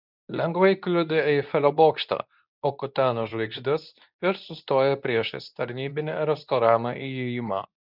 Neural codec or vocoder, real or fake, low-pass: codec, 24 kHz, 0.9 kbps, WavTokenizer, medium speech release version 2; fake; 5.4 kHz